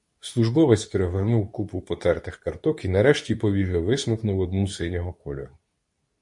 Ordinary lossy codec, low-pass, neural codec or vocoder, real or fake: MP3, 48 kbps; 10.8 kHz; codec, 24 kHz, 0.9 kbps, WavTokenizer, medium speech release version 2; fake